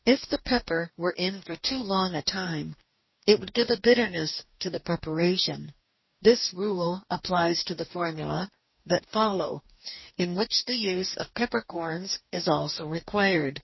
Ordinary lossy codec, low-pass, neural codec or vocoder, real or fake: MP3, 24 kbps; 7.2 kHz; codec, 44.1 kHz, 2.6 kbps, DAC; fake